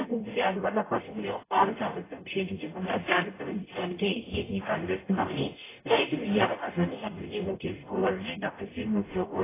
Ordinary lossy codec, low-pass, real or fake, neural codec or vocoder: AAC, 16 kbps; 3.6 kHz; fake; codec, 44.1 kHz, 0.9 kbps, DAC